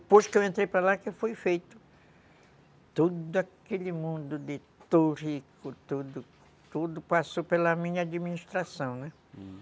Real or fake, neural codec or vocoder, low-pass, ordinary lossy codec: real; none; none; none